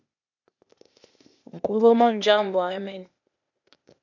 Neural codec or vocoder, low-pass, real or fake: codec, 16 kHz, 0.8 kbps, ZipCodec; 7.2 kHz; fake